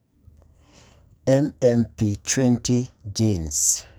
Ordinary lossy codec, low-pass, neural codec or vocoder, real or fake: none; none; codec, 44.1 kHz, 2.6 kbps, SNAC; fake